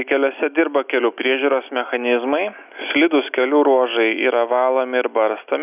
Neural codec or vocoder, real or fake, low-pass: none; real; 3.6 kHz